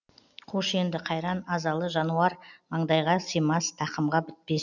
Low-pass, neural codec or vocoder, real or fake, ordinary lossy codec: 7.2 kHz; none; real; none